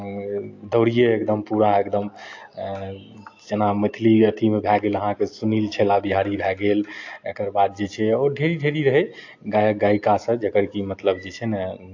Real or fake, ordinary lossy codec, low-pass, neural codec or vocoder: real; AAC, 48 kbps; 7.2 kHz; none